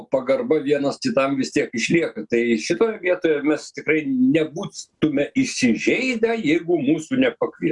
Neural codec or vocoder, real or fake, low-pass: none; real; 10.8 kHz